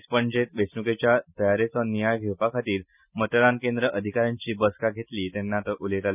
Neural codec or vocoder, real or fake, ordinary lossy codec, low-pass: none; real; none; 3.6 kHz